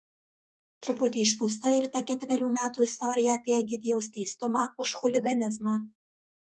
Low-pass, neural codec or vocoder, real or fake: 10.8 kHz; codec, 32 kHz, 1.9 kbps, SNAC; fake